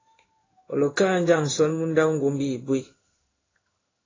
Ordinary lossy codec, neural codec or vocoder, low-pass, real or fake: AAC, 32 kbps; codec, 16 kHz in and 24 kHz out, 1 kbps, XY-Tokenizer; 7.2 kHz; fake